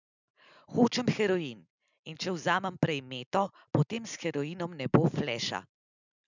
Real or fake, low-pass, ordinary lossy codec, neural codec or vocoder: real; 7.2 kHz; none; none